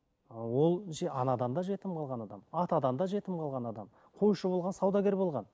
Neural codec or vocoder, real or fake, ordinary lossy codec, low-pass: none; real; none; none